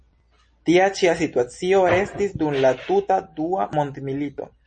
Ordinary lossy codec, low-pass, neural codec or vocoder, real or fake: MP3, 32 kbps; 9.9 kHz; none; real